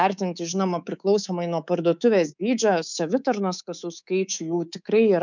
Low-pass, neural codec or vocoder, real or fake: 7.2 kHz; codec, 24 kHz, 3.1 kbps, DualCodec; fake